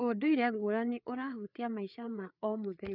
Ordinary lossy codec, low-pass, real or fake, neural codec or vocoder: none; 5.4 kHz; fake; codec, 16 kHz, 4 kbps, FreqCodec, larger model